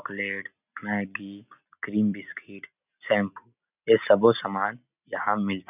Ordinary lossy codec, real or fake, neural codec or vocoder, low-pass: none; real; none; 3.6 kHz